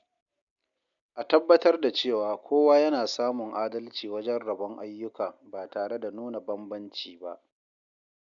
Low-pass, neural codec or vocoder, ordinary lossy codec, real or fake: 7.2 kHz; none; none; real